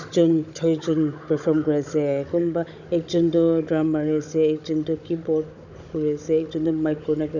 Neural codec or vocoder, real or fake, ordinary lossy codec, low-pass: codec, 16 kHz, 16 kbps, FunCodec, trained on Chinese and English, 50 frames a second; fake; none; 7.2 kHz